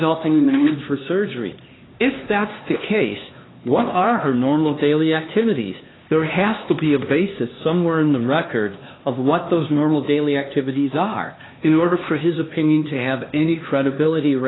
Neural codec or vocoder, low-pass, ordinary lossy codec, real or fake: codec, 16 kHz, 2 kbps, X-Codec, HuBERT features, trained on LibriSpeech; 7.2 kHz; AAC, 16 kbps; fake